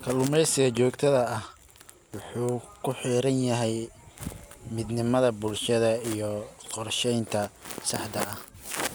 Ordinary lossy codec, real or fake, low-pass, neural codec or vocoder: none; real; none; none